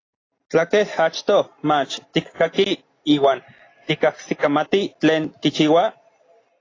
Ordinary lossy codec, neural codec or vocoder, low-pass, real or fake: AAC, 32 kbps; none; 7.2 kHz; real